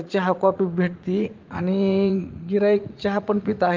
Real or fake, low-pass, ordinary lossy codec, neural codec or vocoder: fake; 7.2 kHz; Opus, 32 kbps; vocoder, 44.1 kHz, 80 mel bands, Vocos